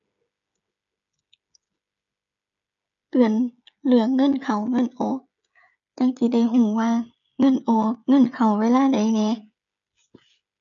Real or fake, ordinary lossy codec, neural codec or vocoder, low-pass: fake; none; codec, 16 kHz, 16 kbps, FreqCodec, smaller model; 7.2 kHz